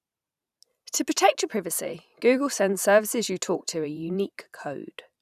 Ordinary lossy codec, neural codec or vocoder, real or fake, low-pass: none; vocoder, 44.1 kHz, 128 mel bands every 512 samples, BigVGAN v2; fake; 14.4 kHz